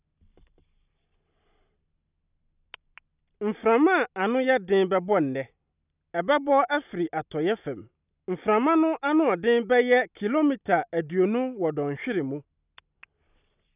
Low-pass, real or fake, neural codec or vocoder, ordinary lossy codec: 3.6 kHz; real; none; none